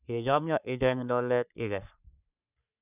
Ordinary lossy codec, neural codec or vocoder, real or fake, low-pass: none; codec, 44.1 kHz, 3.4 kbps, Pupu-Codec; fake; 3.6 kHz